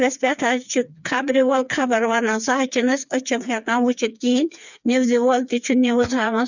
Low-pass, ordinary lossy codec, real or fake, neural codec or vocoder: 7.2 kHz; none; fake; codec, 16 kHz, 4 kbps, FreqCodec, smaller model